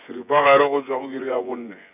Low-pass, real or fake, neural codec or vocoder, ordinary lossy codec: 3.6 kHz; fake; vocoder, 24 kHz, 100 mel bands, Vocos; none